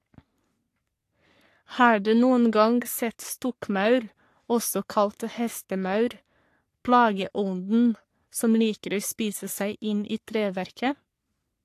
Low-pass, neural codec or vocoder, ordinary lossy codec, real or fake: 14.4 kHz; codec, 44.1 kHz, 3.4 kbps, Pupu-Codec; AAC, 64 kbps; fake